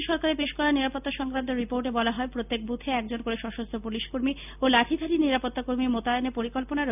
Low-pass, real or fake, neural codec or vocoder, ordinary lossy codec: 3.6 kHz; real; none; none